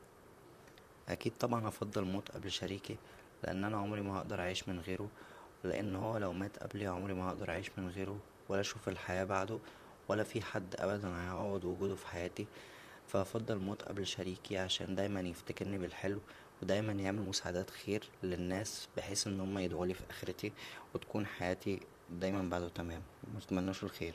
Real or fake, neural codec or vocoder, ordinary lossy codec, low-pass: fake; vocoder, 44.1 kHz, 128 mel bands, Pupu-Vocoder; none; 14.4 kHz